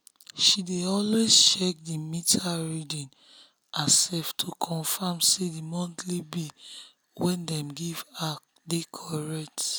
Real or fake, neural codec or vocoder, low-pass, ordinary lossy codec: real; none; none; none